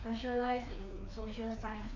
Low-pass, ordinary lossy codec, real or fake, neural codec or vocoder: 7.2 kHz; MP3, 48 kbps; fake; codec, 16 kHz, 2 kbps, FreqCodec, larger model